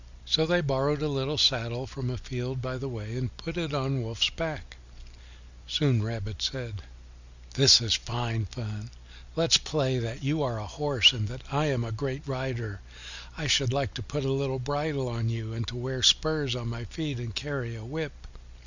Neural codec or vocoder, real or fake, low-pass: none; real; 7.2 kHz